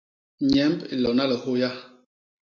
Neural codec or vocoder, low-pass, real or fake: none; 7.2 kHz; real